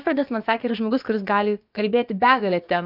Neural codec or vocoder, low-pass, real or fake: codec, 16 kHz, about 1 kbps, DyCAST, with the encoder's durations; 5.4 kHz; fake